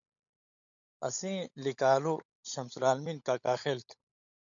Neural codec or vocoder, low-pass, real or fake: codec, 16 kHz, 16 kbps, FunCodec, trained on LibriTTS, 50 frames a second; 7.2 kHz; fake